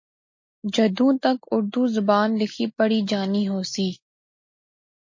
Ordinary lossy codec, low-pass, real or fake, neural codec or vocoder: MP3, 32 kbps; 7.2 kHz; real; none